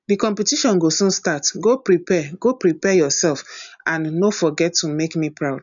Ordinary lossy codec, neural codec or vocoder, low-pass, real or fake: none; none; 7.2 kHz; real